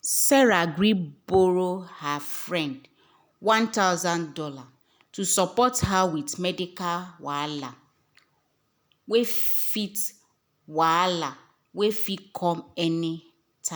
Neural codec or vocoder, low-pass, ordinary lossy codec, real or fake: none; none; none; real